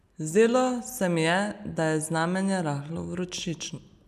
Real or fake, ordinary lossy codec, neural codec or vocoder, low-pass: real; none; none; 14.4 kHz